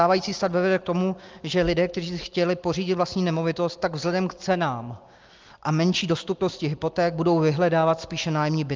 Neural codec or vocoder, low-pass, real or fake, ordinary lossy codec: none; 7.2 kHz; real; Opus, 24 kbps